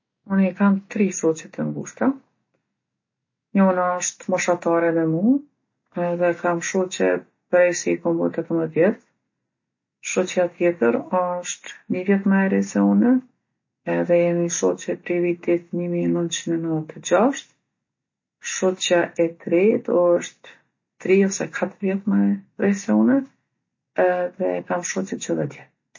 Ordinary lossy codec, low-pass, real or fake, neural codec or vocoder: MP3, 32 kbps; 7.2 kHz; real; none